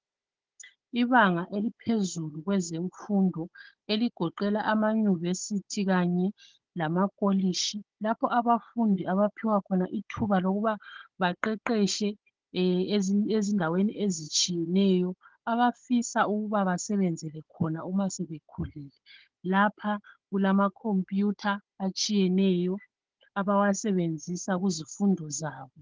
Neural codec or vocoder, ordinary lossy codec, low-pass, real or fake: codec, 16 kHz, 16 kbps, FunCodec, trained on Chinese and English, 50 frames a second; Opus, 16 kbps; 7.2 kHz; fake